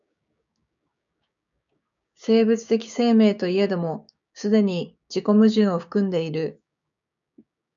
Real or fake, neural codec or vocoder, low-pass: fake; codec, 16 kHz, 6 kbps, DAC; 7.2 kHz